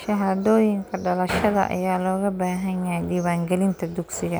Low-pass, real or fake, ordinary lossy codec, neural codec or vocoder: none; real; none; none